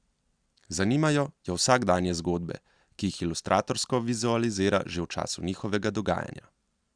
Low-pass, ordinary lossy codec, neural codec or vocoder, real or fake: 9.9 kHz; Opus, 64 kbps; none; real